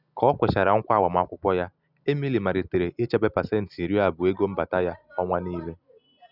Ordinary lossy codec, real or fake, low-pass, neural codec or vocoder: none; real; 5.4 kHz; none